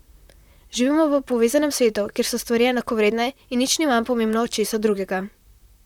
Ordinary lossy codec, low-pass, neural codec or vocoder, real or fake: none; 19.8 kHz; vocoder, 44.1 kHz, 128 mel bands, Pupu-Vocoder; fake